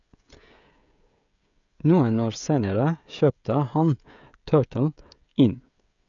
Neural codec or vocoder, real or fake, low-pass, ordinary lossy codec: codec, 16 kHz, 16 kbps, FreqCodec, smaller model; fake; 7.2 kHz; none